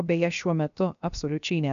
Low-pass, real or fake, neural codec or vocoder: 7.2 kHz; fake; codec, 16 kHz, 0.7 kbps, FocalCodec